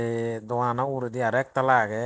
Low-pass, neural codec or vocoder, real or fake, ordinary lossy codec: none; none; real; none